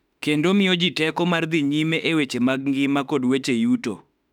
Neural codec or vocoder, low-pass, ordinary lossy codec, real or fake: autoencoder, 48 kHz, 32 numbers a frame, DAC-VAE, trained on Japanese speech; 19.8 kHz; none; fake